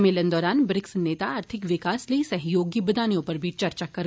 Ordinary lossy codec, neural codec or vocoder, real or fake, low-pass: none; none; real; none